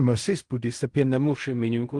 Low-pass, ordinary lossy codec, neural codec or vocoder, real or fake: 10.8 kHz; Opus, 24 kbps; codec, 16 kHz in and 24 kHz out, 0.4 kbps, LongCat-Audio-Codec, fine tuned four codebook decoder; fake